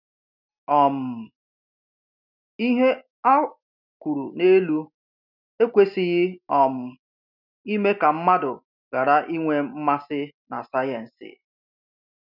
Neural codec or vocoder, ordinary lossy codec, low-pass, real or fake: none; none; 5.4 kHz; real